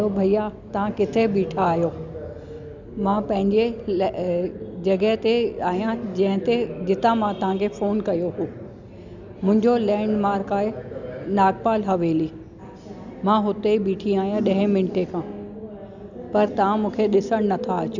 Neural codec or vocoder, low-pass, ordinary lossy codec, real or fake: none; 7.2 kHz; none; real